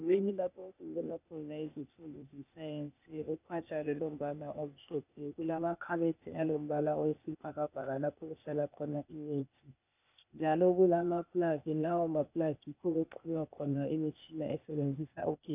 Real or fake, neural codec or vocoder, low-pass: fake; codec, 16 kHz, 0.8 kbps, ZipCodec; 3.6 kHz